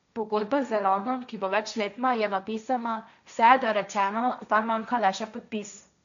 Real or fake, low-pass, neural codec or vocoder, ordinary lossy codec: fake; 7.2 kHz; codec, 16 kHz, 1.1 kbps, Voila-Tokenizer; none